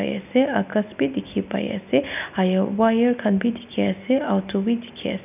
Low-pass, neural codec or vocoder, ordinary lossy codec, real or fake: 3.6 kHz; none; none; real